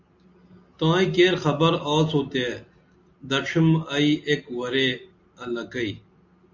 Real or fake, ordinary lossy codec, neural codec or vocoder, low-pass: real; MP3, 64 kbps; none; 7.2 kHz